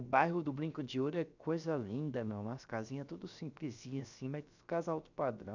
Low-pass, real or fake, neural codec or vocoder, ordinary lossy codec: 7.2 kHz; fake; codec, 16 kHz, about 1 kbps, DyCAST, with the encoder's durations; none